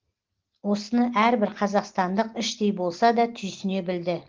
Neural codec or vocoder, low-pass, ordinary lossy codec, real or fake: none; 7.2 kHz; Opus, 16 kbps; real